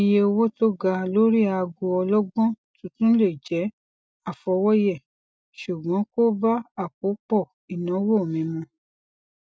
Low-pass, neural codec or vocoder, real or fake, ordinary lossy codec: none; none; real; none